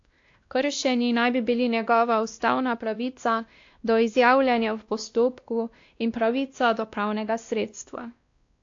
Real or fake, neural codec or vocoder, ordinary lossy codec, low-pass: fake; codec, 16 kHz, 1 kbps, X-Codec, WavLM features, trained on Multilingual LibriSpeech; AAC, 48 kbps; 7.2 kHz